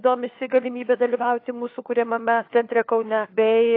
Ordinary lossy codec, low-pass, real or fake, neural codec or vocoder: AAC, 32 kbps; 5.4 kHz; fake; codec, 16 kHz, 4 kbps, FunCodec, trained on LibriTTS, 50 frames a second